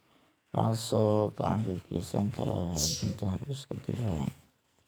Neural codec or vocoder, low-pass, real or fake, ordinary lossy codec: codec, 44.1 kHz, 2.6 kbps, SNAC; none; fake; none